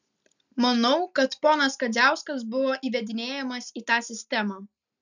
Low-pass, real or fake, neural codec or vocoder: 7.2 kHz; real; none